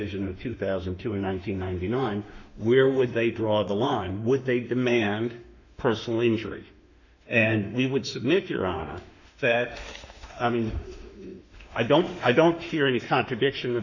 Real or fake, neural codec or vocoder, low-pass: fake; autoencoder, 48 kHz, 32 numbers a frame, DAC-VAE, trained on Japanese speech; 7.2 kHz